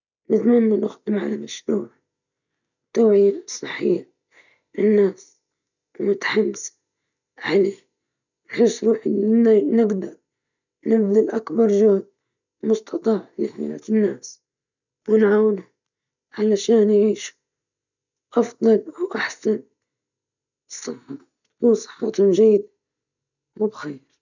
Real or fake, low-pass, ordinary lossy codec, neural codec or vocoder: real; 7.2 kHz; none; none